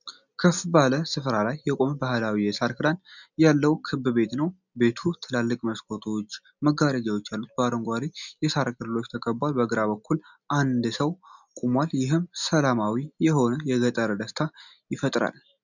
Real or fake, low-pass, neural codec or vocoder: real; 7.2 kHz; none